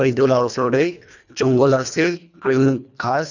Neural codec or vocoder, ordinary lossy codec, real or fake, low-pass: codec, 24 kHz, 1.5 kbps, HILCodec; none; fake; 7.2 kHz